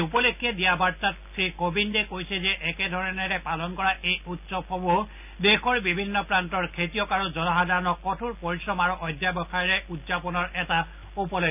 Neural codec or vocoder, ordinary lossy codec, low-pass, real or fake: none; none; 3.6 kHz; real